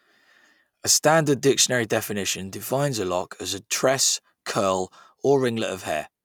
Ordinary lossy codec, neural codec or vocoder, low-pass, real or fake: none; none; none; real